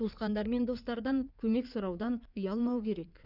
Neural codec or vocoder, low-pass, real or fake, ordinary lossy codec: codec, 16 kHz in and 24 kHz out, 2.2 kbps, FireRedTTS-2 codec; 5.4 kHz; fake; none